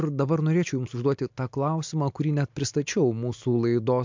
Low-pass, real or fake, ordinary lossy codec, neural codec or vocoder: 7.2 kHz; real; MP3, 64 kbps; none